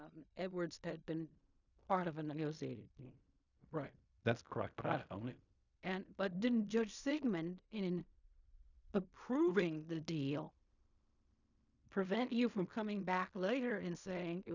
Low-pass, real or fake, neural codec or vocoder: 7.2 kHz; fake; codec, 16 kHz in and 24 kHz out, 0.4 kbps, LongCat-Audio-Codec, fine tuned four codebook decoder